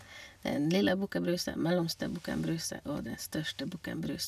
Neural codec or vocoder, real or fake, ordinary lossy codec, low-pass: none; real; none; 14.4 kHz